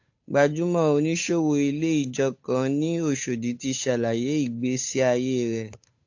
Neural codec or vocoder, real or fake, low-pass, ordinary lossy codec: codec, 16 kHz, 8 kbps, FunCodec, trained on Chinese and English, 25 frames a second; fake; 7.2 kHz; AAC, 48 kbps